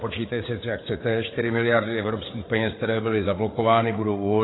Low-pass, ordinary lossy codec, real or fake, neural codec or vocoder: 7.2 kHz; AAC, 16 kbps; fake; codec, 16 kHz, 8 kbps, FreqCodec, larger model